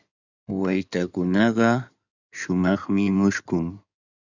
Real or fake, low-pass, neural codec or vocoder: fake; 7.2 kHz; codec, 16 kHz in and 24 kHz out, 2.2 kbps, FireRedTTS-2 codec